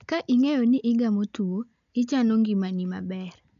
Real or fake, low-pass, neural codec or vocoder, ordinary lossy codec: real; 7.2 kHz; none; none